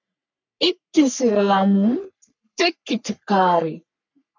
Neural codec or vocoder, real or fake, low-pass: codec, 44.1 kHz, 3.4 kbps, Pupu-Codec; fake; 7.2 kHz